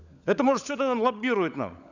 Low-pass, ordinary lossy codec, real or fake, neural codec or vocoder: 7.2 kHz; none; fake; codec, 16 kHz, 16 kbps, FunCodec, trained on LibriTTS, 50 frames a second